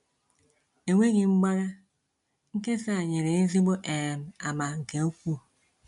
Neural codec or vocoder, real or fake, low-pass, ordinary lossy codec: none; real; 10.8 kHz; MP3, 64 kbps